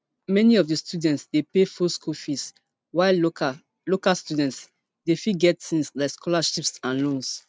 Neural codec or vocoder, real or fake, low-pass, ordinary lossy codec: none; real; none; none